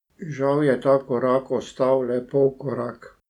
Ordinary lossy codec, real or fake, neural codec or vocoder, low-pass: none; real; none; 19.8 kHz